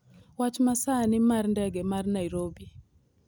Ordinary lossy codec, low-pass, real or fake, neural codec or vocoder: none; none; real; none